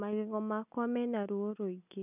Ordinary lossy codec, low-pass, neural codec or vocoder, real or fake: none; 3.6 kHz; none; real